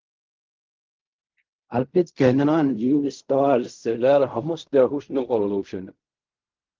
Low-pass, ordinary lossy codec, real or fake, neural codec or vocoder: 7.2 kHz; Opus, 24 kbps; fake; codec, 16 kHz in and 24 kHz out, 0.4 kbps, LongCat-Audio-Codec, fine tuned four codebook decoder